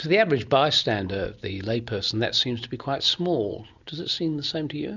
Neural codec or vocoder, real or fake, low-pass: none; real; 7.2 kHz